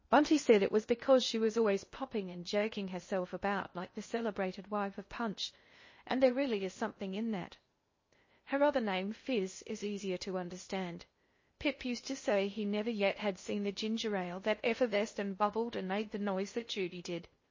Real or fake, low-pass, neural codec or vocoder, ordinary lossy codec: fake; 7.2 kHz; codec, 16 kHz in and 24 kHz out, 0.6 kbps, FocalCodec, streaming, 2048 codes; MP3, 32 kbps